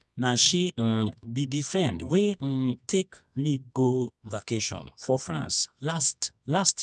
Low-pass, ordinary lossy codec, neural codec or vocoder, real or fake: none; none; codec, 24 kHz, 0.9 kbps, WavTokenizer, medium music audio release; fake